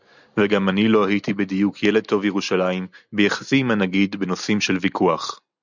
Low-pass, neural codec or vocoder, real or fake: 7.2 kHz; none; real